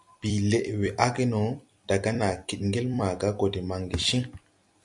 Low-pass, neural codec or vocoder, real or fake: 10.8 kHz; vocoder, 44.1 kHz, 128 mel bands every 256 samples, BigVGAN v2; fake